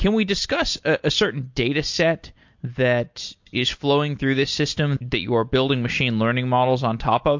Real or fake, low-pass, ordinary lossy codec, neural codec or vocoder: real; 7.2 kHz; MP3, 48 kbps; none